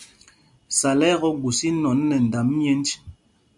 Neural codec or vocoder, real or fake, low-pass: none; real; 10.8 kHz